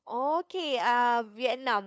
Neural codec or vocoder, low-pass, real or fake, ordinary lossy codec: codec, 16 kHz, 2 kbps, FunCodec, trained on LibriTTS, 25 frames a second; none; fake; none